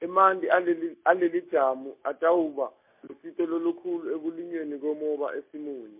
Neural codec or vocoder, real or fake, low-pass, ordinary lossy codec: none; real; 3.6 kHz; MP3, 32 kbps